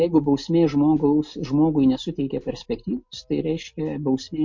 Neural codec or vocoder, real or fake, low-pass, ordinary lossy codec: none; real; 7.2 kHz; MP3, 48 kbps